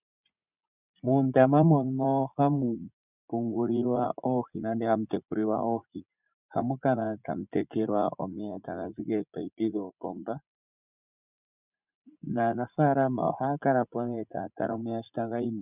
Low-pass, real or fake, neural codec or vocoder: 3.6 kHz; fake; vocoder, 44.1 kHz, 80 mel bands, Vocos